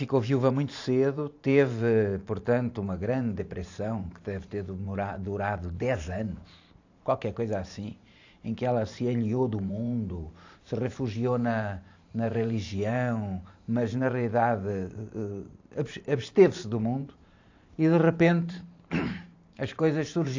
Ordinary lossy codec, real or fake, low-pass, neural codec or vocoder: AAC, 48 kbps; fake; 7.2 kHz; vocoder, 44.1 kHz, 128 mel bands every 512 samples, BigVGAN v2